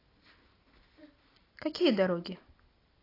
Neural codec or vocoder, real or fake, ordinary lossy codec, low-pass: none; real; AAC, 24 kbps; 5.4 kHz